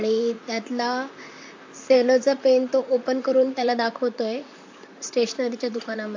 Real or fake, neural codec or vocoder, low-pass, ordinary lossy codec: fake; vocoder, 44.1 kHz, 128 mel bands, Pupu-Vocoder; 7.2 kHz; AAC, 48 kbps